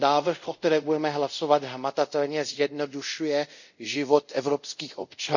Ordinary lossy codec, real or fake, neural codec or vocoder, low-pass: none; fake; codec, 24 kHz, 0.5 kbps, DualCodec; 7.2 kHz